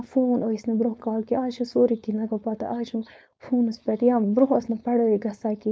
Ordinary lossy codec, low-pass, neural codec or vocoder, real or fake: none; none; codec, 16 kHz, 4.8 kbps, FACodec; fake